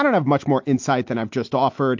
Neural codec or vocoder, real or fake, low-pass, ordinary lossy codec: none; real; 7.2 kHz; MP3, 48 kbps